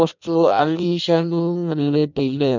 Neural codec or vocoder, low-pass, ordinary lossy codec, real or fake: codec, 16 kHz in and 24 kHz out, 0.6 kbps, FireRedTTS-2 codec; 7.2 kHz; none; fake